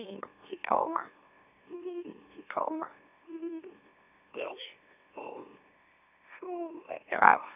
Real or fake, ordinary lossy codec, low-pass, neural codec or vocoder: fake; none; 3.6 kHz; autoencoder, 44.1 kHz, a latent of 192 numbers a frame, MeloTTS